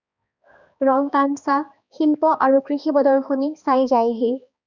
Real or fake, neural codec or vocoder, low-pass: fake; codec, 16 kHz, 2 kbps, X-Codec, HuBERT features, trained on balanced general audio; 7.2 kHz